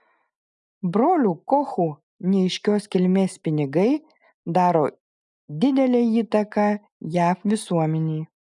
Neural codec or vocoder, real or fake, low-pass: none; real; 10.8 kHz